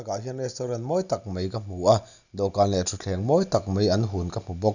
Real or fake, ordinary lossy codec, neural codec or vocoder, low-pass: real; none; none; 7.2 kHz